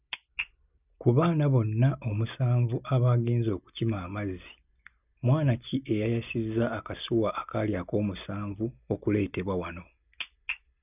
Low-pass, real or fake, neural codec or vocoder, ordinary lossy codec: 3.6 kHz; real; none; none